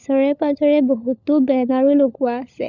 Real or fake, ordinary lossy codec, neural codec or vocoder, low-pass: fake; none; codec, 16 kHz, 16 kbps, FunCodec, trained on LibriTTS, 50 frames a second; 7.2 kHz